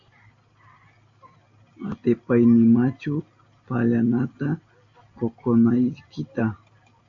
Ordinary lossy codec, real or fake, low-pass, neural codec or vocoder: AAC, 48 kbps; real; 7.2 kHz; none